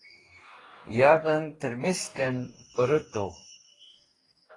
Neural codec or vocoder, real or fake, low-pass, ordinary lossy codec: codec, 44.1 kHz, 2.6 kbps, DAC; fake; 10.8 kHz; AAC, 32 kbps